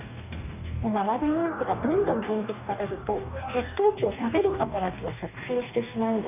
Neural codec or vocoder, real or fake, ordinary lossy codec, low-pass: codec, 44.1 kHz, 2.6 kbps, DAC; fake; none; 3.6 kHz